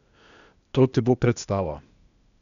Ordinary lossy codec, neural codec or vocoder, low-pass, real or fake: none; codec, 16 kHz, 0.8 kbps, ZipCodec; 7.2 kHz; fake